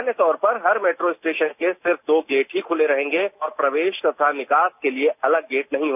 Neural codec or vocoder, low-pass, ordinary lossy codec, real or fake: none; 3.6 kHz; none; real